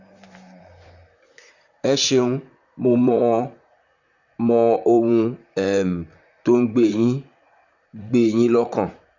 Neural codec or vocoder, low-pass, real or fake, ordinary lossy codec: vocoder, 44.1 kHz, 128 mel bands, Pupu-Vocoder; 7.2 kHz; fake; none